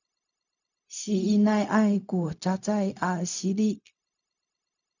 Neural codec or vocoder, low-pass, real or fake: codec, 16 kHz, 0.4 kbps, LongCat-Audio-Codec; 7.2 kHz; fake